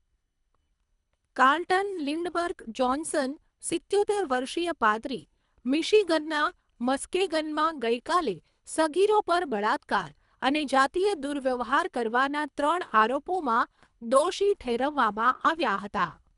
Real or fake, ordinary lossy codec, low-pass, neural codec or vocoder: fake; none; 10.8 kHz; codec, 24 kHz, 3 kbps, HILCodec